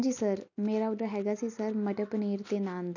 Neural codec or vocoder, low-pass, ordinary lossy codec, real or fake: none; 7.2 kHz; none; real